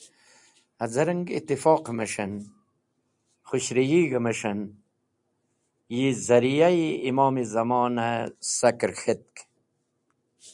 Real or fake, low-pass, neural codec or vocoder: real; 10.8 kHz; none